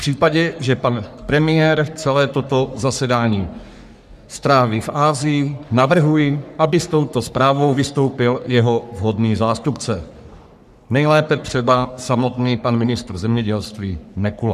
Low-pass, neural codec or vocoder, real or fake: 14.4 kHz; codec, 44.1 kHz, 3.4 kbps, Pupu-Codec; fake